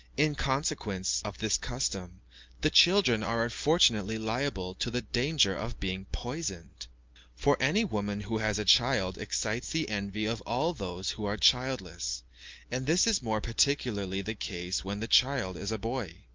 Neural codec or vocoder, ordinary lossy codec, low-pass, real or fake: none; Opus, 32 kbps; 7.2 kHz; real